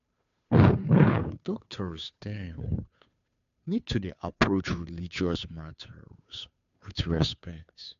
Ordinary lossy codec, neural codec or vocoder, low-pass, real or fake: MP3, 64 kbps; codec, 16 kHz, 2 kbps, FunCodec, trained on Chinese and English, 25 frames a second; 7.2 kHz; fake